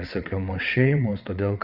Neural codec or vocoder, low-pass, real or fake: vocoder, 22.05 kHz, 80 mel bands, WaveNeXt; 5.4 kHz; fake